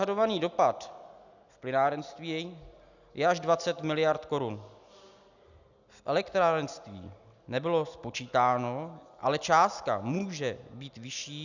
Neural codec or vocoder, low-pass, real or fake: none; 7.2 kHz; real